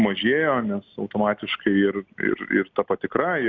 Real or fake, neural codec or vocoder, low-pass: real; none; 7.2 kHz